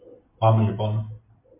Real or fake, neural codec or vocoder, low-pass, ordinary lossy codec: real; none; 3.6 kHz; MP3, 24 kbps